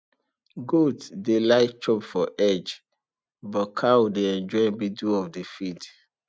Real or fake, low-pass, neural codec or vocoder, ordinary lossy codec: real; none; none; none